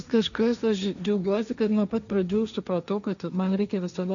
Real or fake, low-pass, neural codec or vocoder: fake; 7.2 kHz; codec, 16 kHz, 1.1 kbps, Voila-Tokenizer